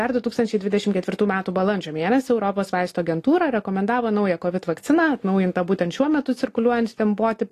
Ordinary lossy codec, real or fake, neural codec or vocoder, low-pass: AAC, 48 kbps; real; none; 14.4 kHz